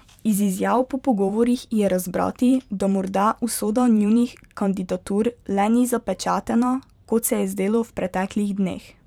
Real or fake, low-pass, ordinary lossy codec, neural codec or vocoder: fake; 19.8 kHz; none; vocoder, 44.1 kHz, 128 mel bands every 512 samples, BigVGAN v2